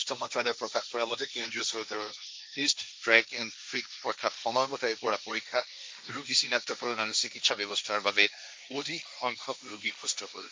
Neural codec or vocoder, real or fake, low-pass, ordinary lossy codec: codec, 16 kHz, 1.1 kbps, Voila-Tokenizer; fake; none; none